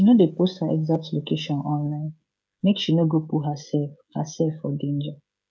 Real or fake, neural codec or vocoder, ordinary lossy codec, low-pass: fake; codec, 16 kHz, 16 kbps, FreqCodec, smaller model; none; none